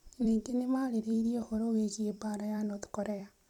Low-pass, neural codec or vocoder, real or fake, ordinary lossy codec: 19.8 kHz; vocoder, 44.1 kHz, 128 mel bands every 256 samples, BigVGAN v2; fake; none